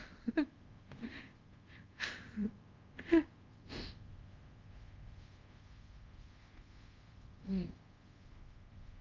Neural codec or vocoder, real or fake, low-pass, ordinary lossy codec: codec, 24 kHz, 0.5 kbps, DualCodec; fake; 7.2 kHz; Opus, 32 kbps